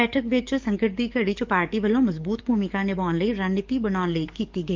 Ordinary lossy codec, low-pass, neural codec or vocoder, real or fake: Opus, 24 kbps; 7.2 kHz; codec, 44.1 kHz, 7.8 kbps, DAC; fake